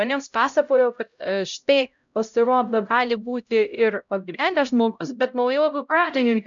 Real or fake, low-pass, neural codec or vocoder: fake; 7.2 kHz; codec, 16 kHz, 0.5 kbps, X-Codec, HuBERT features, trained on LibriSpeech